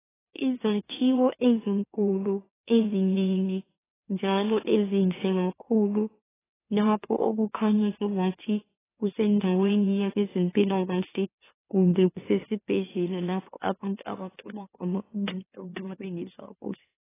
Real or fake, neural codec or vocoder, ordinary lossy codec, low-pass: fake; autoencoder, 44.1 kHz, a latent of 192 numbers a frame, MeloTTS; AAC, 16 kbps; 3.6 kHz